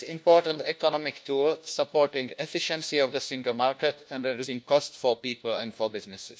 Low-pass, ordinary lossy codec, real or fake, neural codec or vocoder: none; none; fake; codec, 16 kHz, 1 kbps, FunCodec, trained on LibriTTS, 50 frames a second